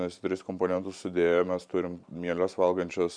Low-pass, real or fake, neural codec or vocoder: 9.9 kHz; fake; vocoder, 44.1 kHz, 128 mel bands every 512 samples, BigVGAN v2